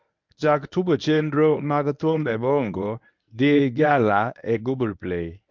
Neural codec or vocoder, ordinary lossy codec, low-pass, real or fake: codec, 24 kHz, 0.9 kbps, WavTokenizer, medium speech release version 1; MP3, 64 kbps; 7.2 kHz; fake